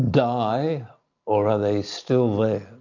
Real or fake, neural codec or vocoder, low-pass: real; none; 7.2 kHz